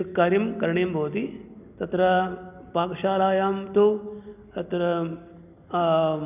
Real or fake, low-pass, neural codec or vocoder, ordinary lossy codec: real; 3.6 kHz; none; none